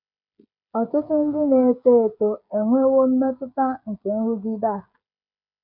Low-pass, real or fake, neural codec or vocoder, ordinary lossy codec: 5.4 kHz; fake; codec, 16 kHz, 16 kbps, FreqCodec, smaller model; none